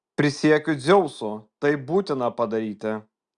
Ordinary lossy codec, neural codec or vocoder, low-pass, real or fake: Opus, 64 kbps; none; 9.9 kHz; real